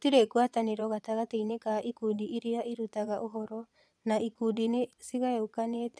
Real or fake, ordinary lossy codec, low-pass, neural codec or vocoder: fake; none; none; vocoder, 22.05 kHz, 80 mel bands, Vocos